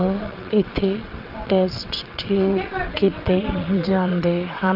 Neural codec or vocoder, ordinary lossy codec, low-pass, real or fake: vocoder, 22.05 kHz, 80 mel bands, WaveNeXt; Opus, 24 kbps; 5.4 kHz; fake